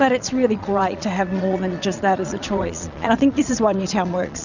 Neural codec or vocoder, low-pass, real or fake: vocoder, 22.05 kHz, 80 mel bands, Vocos; 7.2 kHz; fake